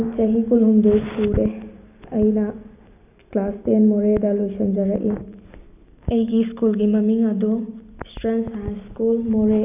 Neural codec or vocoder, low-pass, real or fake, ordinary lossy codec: none; 3.6 kHz; real; none